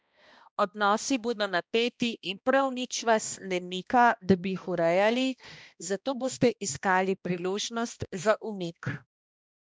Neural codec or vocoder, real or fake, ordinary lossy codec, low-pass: codec, 16 kHz, 1 kbps, X-Codec, HuBERT features, trained on balanced general audio; fake; none; none